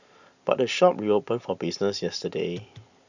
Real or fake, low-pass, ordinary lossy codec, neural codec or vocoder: real; 7.2 kHz; none; none